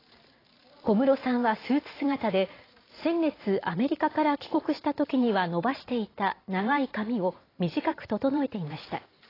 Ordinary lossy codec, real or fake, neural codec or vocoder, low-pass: AAC, 24 kbps; fake; vocoder, 44.1 kHz, 128 mel bands every 512 samples, BigVGAN v2; 5.4 kHz